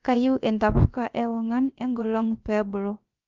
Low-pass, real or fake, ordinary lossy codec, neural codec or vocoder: 7.2 kHz; fake; Opus, 32 kbps; codec, 16 kHz, about 1 kbps, DyCAST, with the encoder's durations